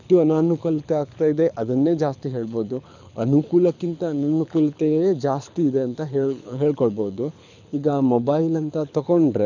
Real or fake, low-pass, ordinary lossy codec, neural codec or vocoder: fake; 7.2 kHz; none; codec, 24 kHz, 6 kbps, HILCodec